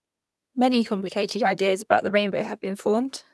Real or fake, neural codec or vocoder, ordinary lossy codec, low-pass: fake; codec, 24 kHz, 1 kbps, SNAC; none; none